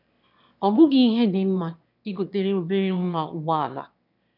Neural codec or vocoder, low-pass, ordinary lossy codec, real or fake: autoencoder, 22.05 kHz, a latent of 192 numbers a frame, VITS, trained on one speaker; 5.4 kHz; none; fake